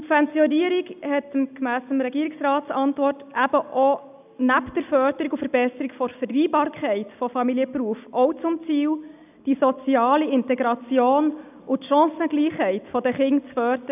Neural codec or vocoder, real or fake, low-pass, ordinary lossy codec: none; real; 3.6 kHz; none